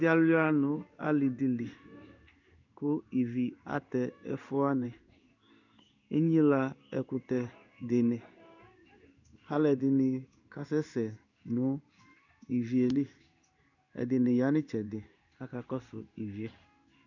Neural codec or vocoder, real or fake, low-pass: codec, 16 kHz in and 24 kHz out, 1 kbps, XY-Tokenizer; fake; 7.2 kHz